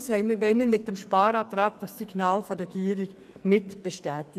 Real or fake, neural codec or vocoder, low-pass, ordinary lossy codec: fake; codec, 44.1 kHz, 2.6 kbps, SNAC; 14.4 kHz; none